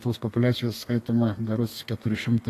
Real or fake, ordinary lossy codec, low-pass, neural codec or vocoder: fake; AAC, 48 kbps; 14.4 kHz; codec, 32 kHz, 1.9 kbps, SNAC